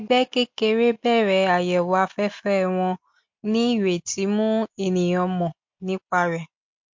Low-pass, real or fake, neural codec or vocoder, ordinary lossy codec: 7.2 kHz; real; none; MP3, 48 kbps